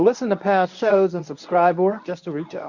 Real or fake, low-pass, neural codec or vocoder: fake; 7.2 kHz; codec, 24 kHz, 0.9 kbps, WavTokenizer, medium speech release version 1